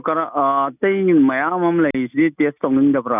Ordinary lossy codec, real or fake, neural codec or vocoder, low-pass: none; real; none; 3.6 kHz